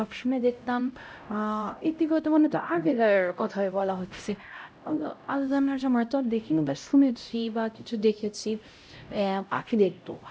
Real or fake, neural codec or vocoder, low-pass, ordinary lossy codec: fake; codec, 16 kHz, 0.5 kbps, X-Codec, HuBERT features, trained on LibriSpeech; none; none